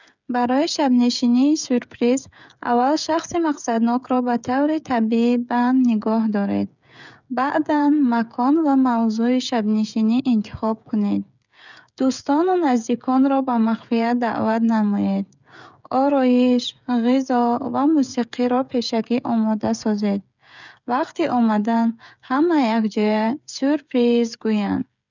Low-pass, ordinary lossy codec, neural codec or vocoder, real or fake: 7.2 kHz; none; codec, 16 kHz, 16 kbps, FreqCodec, smaller model; fake